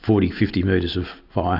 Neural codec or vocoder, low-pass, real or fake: none; 5.4 kHz; real